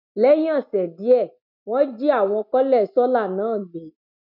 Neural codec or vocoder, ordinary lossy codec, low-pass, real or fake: none; none; 5.4 kHz; real